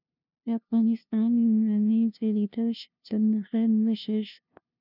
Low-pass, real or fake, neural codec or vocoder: 5.4 kHz; fake; codec, 16 kHz, 0.5 kbps, FunCodec, trained on LibriTTS, 25 frames a second